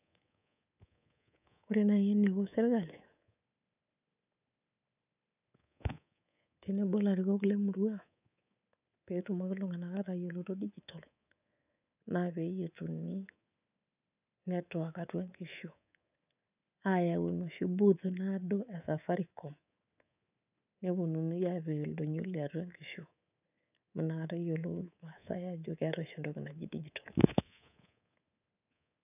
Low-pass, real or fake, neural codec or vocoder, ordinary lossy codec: 3.6 kHz; fake; codec, 24 kHz, 3.1 kbps, DualCodec; none